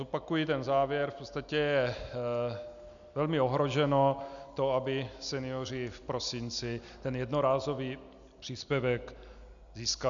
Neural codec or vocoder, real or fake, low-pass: none; real; 7.2 kHz